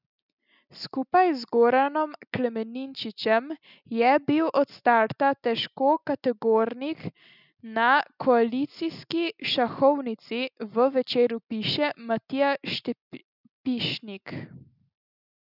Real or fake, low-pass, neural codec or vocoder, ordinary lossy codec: real; 5.4 kHz; none; none